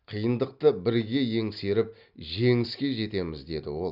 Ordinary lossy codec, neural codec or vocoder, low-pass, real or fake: none; none; 5.4 kHz; real